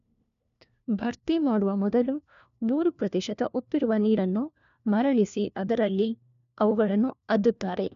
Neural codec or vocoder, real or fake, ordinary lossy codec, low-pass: codec, 16 kHz, 1 kbps, FunCodec, trained on LibriTTS, 50 frames a second; fake; none; 7.2 kHz